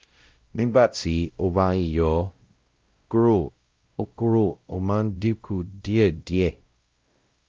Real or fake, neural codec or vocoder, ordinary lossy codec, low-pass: fake; codec, 16 kHz, 0.5 kbps, X-Codec, WavLM features, trained on Multilingual LibriSpeech; Opus, 24 kbps; 7.2 kHz